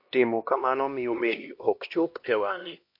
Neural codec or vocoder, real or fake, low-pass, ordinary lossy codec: codec, 16 kHz, 1 kbps, X-Codec, HuBERT features, trained on LibriSpeech; fake; 5.4 kHz; MP3, 32 kbps